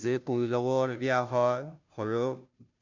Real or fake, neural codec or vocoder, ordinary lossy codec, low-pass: fake; codec, 16 kHz, 0.5 kbps, FunCodec, trained on Chinese and English, 25 frames a second; none; 7.2 kHz